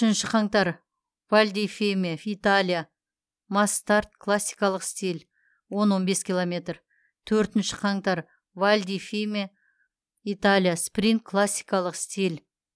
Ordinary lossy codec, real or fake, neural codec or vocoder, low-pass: none; real; none; none